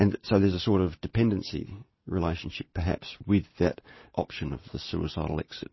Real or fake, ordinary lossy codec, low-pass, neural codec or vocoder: real; MP3, 24 kbps; 7.2 kHz; none